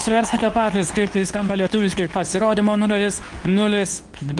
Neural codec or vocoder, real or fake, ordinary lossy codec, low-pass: codec, 24 kHz, 0.9 kbps, WavTokenizer, medium speech release version 2; fake; Opus, 32 kbps; 10.8 kHz